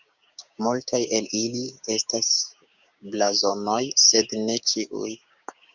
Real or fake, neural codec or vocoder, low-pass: fake; codec, 16 kHz, 6 kbps, DAC; 7.2 kHz